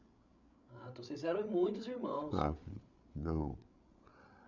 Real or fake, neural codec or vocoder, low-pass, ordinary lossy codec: fake; codec, 16 kHz, 8 kbps, FreqCodec, larger model; 7.2 kHz; none